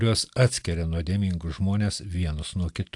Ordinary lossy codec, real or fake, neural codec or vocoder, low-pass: AAC, 64 kbps; real; none; 10.8 kHz